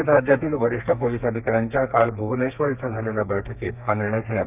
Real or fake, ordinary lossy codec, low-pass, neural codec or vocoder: fake; none; 3.6 kHz; codec, 32 kHz, 1.9 kbps, SNAC